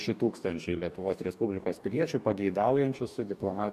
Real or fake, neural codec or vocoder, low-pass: fake; codec, 44.1 kHz, 2.6 kbps, DAC; 14.4 kHz